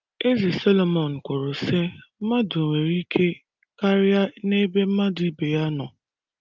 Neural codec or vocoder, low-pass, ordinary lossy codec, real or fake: none; 7.2 kHz; Opus, 32 kbps; real